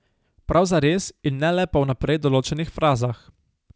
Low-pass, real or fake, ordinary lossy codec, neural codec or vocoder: none; real; none; none